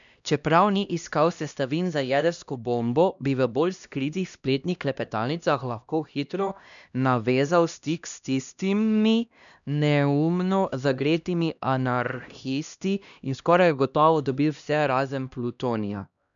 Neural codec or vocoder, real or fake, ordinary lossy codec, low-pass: codec, 16 kHz, 1 kbps, X-Codec, HuBERT features, trained on LibriSpeech; fake; none; 7.2 kHz